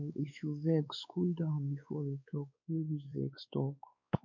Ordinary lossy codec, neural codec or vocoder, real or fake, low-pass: none; codec, 16 kHz, 4 kbps, X-Codec, WavLM features, trained on Multilingual LibriSpeech; fake; 7.2 kHz